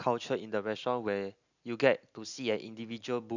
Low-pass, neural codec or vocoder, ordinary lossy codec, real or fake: 7.2 kHz; none; none; real